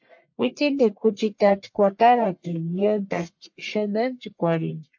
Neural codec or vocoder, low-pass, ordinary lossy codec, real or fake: codec, 44.1 kHz, 1.7 kbps, Pupu-Codec; 7.2 kHz; MP3, 48 kbps; fake